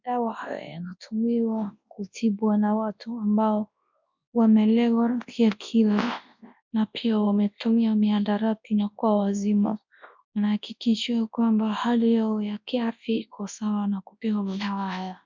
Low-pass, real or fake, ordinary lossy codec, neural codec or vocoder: 7.2 kHz; fake; MP3, 64 kbps; codec, 24 kHz, 0.9 kbps, WavTokenizer, large speech release